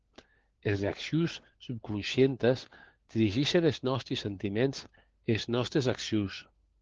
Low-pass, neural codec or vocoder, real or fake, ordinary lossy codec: 7.2 kHz; codec, 16 kHz, 4 kbps, FunCodec, trained on LibriTTS, 50 frames a second; fake; Opus, 16 kbps